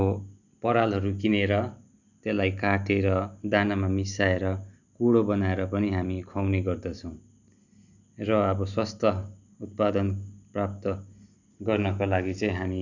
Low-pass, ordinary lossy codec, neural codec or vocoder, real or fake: 7.2 kHz; none; none; real